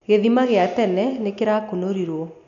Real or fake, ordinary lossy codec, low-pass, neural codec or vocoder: real; none; 7.2 kHz; none